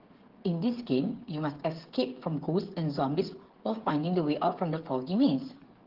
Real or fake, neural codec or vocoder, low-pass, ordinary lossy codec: fake; codec, 16 kHz, 8 kbps, FreqCodec, smaller model; 5.4 kHz; Opus, 16 kbps